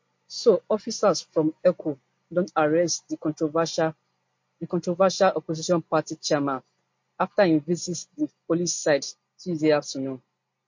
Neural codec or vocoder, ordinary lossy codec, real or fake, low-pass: none; MP3, 48 kbps; real; 7.2 kHz